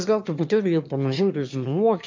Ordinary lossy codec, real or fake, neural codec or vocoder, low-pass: MP3, 64 kbps; fake; autoencoder, 22.05 kHz, a latent of 192 numbers a frame, VITS, trained on one speaker; 7.2 kHz